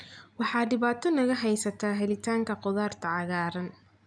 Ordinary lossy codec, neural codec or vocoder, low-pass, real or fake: none; none; 9.9 kHz; real